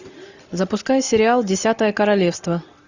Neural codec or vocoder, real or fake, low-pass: none; real; 7.2 kHz